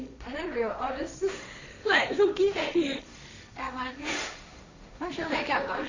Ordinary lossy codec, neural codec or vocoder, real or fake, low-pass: AAC, 48 kbps; codec, 16 kHz, 1.1 kbps, Voila-Tokenizer; fake; 7.2 kHz